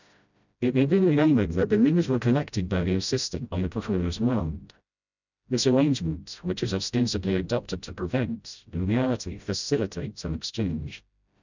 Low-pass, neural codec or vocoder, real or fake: 7.2 kHz; codec, 16 kHz, 0.5 kbps, FreqCodec, smaller model; fake